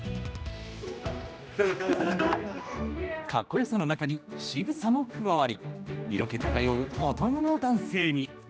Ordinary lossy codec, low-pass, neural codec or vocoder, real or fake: none; none; codec, 16 kHz, 1 kbps, X-Codec, HuBERT features, trained on general audio; fake